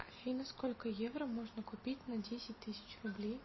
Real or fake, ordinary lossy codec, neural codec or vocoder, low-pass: real; MP3, 24 kbps; none; 7.2 kHz